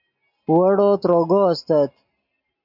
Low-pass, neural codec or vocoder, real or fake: 5.4 kHz; none; real